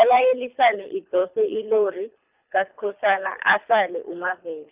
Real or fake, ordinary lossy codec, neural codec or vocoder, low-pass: fake; Opus, 64 kbps; codec, 24 kHz, 3 kbps, HILCodec; 3.6 kHz